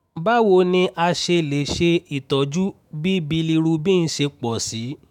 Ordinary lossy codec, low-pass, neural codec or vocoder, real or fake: none; 19.8 kHz; autoencoder, 48 kHz, 128 numbers a frame, DAC-VAE, trained on Japanese speech; fake